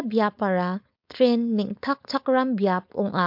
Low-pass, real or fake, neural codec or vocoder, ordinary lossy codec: 5.4 kHz; fake; codec, 16 kHz, 4.8 kbps, FACodec; MP3, 48 kbps